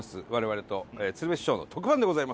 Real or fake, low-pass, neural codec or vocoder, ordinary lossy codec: real; none; none; none